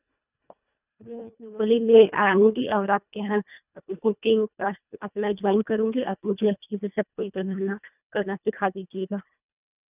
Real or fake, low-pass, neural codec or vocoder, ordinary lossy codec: fake; 3.6 kHz; codec, 24 kHz, 1.5 kbps, HILCodec; none